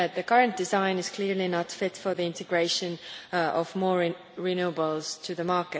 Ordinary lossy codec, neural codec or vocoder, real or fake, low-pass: none; none; real; none